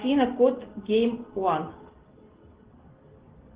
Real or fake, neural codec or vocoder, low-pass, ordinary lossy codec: real; none; 3.6 kHz; Opus, 16 kbps